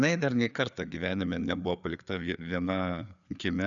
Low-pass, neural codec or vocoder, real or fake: 7.2 kHz; codec, 16 kHz, 4 kbps, FreqCodec, larger model; fake